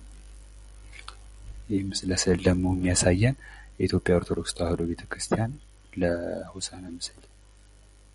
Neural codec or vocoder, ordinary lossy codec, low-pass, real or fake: none; MP3, 48 kbps; 19.8 kHz; real